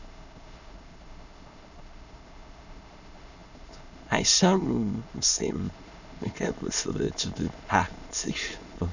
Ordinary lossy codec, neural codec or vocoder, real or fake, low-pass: none; autoencoder, 22.05 kHz, a latent of 192 numbers a frame, VITS, trained on many speakers; fake; 7.2 kHz